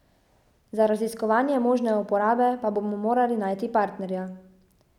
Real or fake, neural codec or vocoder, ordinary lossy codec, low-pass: real; none; none; 19.8 kHz